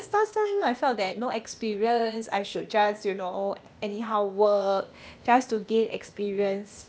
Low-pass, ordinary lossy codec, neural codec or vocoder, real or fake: none; none; codec, 16 kHz, 0.8 kbps, ZipCodec; fake